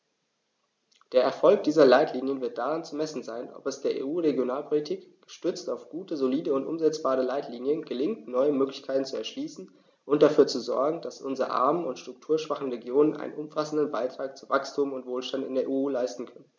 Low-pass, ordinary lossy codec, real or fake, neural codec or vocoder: none; none; real; none